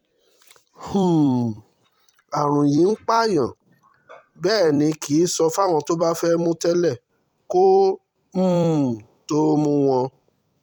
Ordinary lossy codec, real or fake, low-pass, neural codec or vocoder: none; fake; 19.8 kHz; vocoder, 44.1 kHz, 128 mel bands every 256 samples, BigVGAN v2